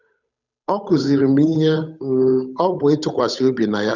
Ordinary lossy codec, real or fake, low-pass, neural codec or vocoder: none; fake; 7.2 kHz; codec, 16 kHz, 8 kbps, FunCodec, trained on Chinese and English, 25 frames a second